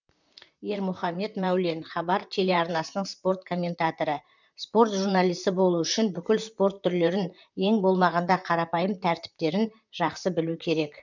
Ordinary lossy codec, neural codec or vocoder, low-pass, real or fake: none; vocoder, 44.1 kHz, 128 mel bands, Pupu-Vocoder; 7.2 kHz; fake